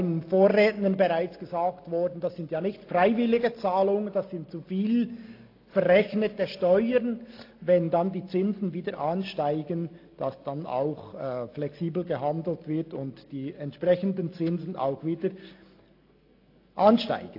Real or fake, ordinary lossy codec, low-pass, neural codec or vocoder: real; AAC, 32 kbps; 5.4 kHz; none